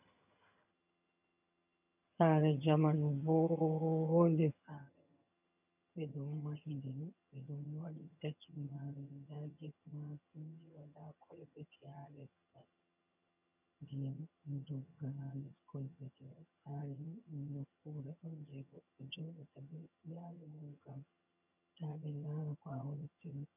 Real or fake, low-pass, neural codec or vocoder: fake; 3.6 kHz; vocoder, 22.05 kHz, 80 mel bands, HiFi-GAN